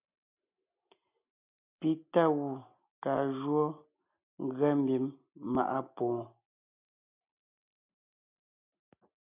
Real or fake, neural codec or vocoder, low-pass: real; none; 3.6 kHz